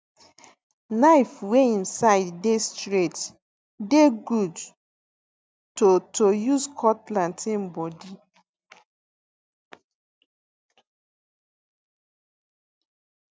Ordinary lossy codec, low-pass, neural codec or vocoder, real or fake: none; none; none; real